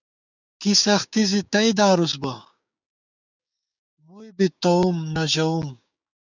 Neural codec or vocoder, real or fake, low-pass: codec, 16 kHz, 6 kbps, DAC; fake; 7.2 kHz